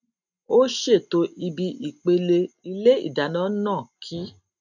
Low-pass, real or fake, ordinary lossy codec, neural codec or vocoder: 7.2 kHz; real; none; none